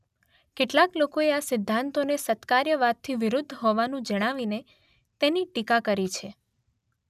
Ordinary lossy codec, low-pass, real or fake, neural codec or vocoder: none; 14.4 kHz; real; none